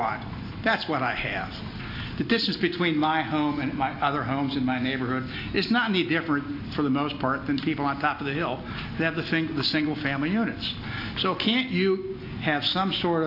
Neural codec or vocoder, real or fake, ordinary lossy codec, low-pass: none; real; AAC, 32 kbps; 5.4 kHz